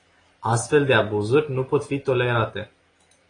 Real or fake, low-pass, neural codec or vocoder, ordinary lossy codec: real; 9.9 kHz; none; AAC, 32 kbps